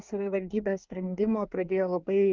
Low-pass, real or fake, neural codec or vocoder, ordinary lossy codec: 7.2 kHz; fake; codec, 24 kHz, 1 kbps, SNAC; Opus, 24 kbps